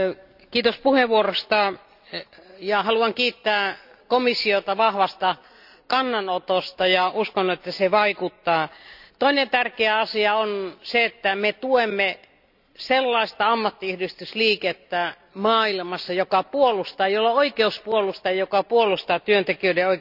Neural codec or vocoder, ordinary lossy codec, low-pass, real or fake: none; none; 5.4 kHz; real